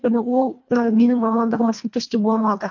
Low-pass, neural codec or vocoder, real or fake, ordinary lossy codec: 7.2 kHz; codec, 24 kHz, 1.5 kbps, HILCodec; fake; MP3, 48 kbps